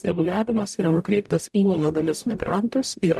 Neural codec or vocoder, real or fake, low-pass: codec, 44.1 kHz, 0.9 kbps, DAC; fake; 14.4 kHz